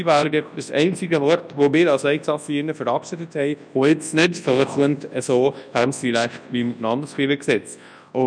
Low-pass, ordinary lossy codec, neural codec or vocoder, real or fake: 9.9 kHz; none; codec, 24 kHz, 0.9 kbps, WavTokenizer, large speech release; fake